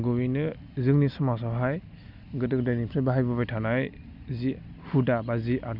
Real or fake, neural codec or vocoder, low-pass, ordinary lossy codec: real; none; 5.4 kHz; none